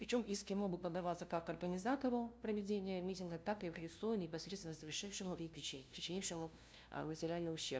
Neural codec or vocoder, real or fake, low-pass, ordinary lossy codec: codec, 16 kHz, 0.5 kbps, FunCodec, trained on LibriTTS, 25 frames a second; fake; none; none